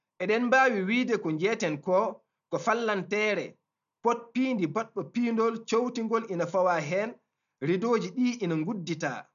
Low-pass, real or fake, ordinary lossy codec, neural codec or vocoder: 7.2 kHz; real; none; none